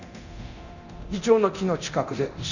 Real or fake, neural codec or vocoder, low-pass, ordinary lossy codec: fake; codec, 24 kHz, 0.9 kbps, DualCodec; 7.2 kHz; none